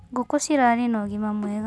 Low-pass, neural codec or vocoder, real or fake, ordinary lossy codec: none; none; real; none